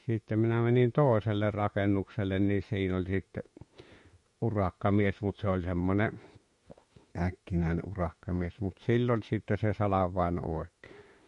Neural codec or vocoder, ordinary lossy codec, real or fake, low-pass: autoencoder, 48 kHz, 32 numbers a frame, DAC-VAE, trained on Japanese speech; MP3, 48 kbps; fake; 14.4 kHz